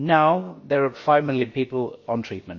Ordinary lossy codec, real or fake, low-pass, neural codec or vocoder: MP3, 32 kbps; fake; 7.2 kHz; codec, 16 kHz, about 1 kbps, DyCAST, with the encoder's durations